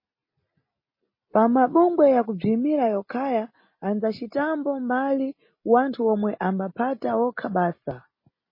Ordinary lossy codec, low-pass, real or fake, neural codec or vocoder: MP3, 24 kbps; 5.4 kHz; real; none